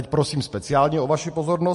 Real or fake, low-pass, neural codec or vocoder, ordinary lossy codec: real; 14.4 kHz; none; MP3, 48 kbps